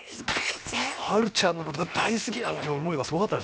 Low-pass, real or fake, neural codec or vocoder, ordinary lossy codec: none; fake; codec, 16 kHz, 0.7 kbps, FocalCodec; none